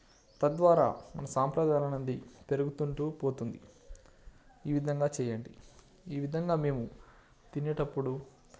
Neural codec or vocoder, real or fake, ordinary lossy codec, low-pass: none; real; none; none